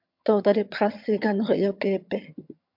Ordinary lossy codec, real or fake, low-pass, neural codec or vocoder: MP3, 48 kbps; fake; 5.4 kHz; vocoder, 22.05 kHz, 80 mel bands, HiFi-GAN